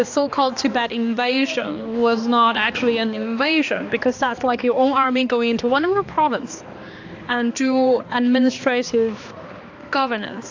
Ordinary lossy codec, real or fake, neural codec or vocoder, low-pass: AAC, 48 kbps; fake; codec, 16 kHz, 2 kbps, X-Codec, HuBERT features, trained on balanced general audio; 7.2 kHz